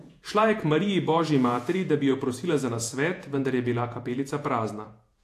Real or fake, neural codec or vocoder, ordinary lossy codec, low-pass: fake; vocoder, 48 kHz, 128 mel bands, Vocos; AAC, 64 kbps; 14.4 kHz